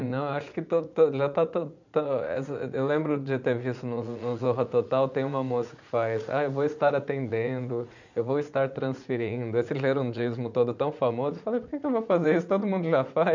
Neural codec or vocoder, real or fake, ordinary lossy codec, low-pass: vocoder, 44.1 kHz, 80 mel bands, Vocos; fake; none; 7.2 kHz